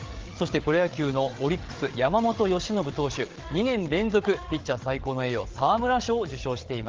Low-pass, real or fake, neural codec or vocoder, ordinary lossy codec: 7.2 kHz; fake; codec, 16 kHz, 8 kbps, FreqCodec, larger model; Opus, 16 kbps